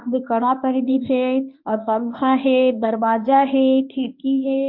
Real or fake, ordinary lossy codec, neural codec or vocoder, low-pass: fake; MP3, 48 kbps; codec, 24 kHz, 0.9 kbps, WavTokenizer, medium speech release version 1; 5.4 kHz